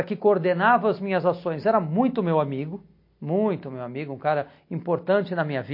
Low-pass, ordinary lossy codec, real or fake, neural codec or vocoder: 5.4 kHz; MP3, 32 kbps; real; none